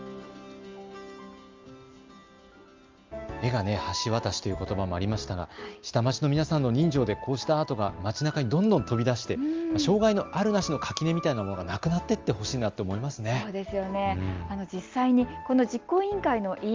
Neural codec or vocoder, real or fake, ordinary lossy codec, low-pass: none; real; Opus, 32 kbps; 7.2 kHz